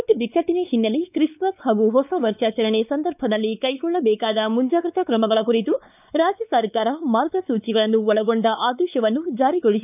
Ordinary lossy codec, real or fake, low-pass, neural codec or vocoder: none; fake; 3.6 kHz; codec, 16 kHz, 4 kbps, X-Codec, WavLM features, trained on Multilingual LibriSpeech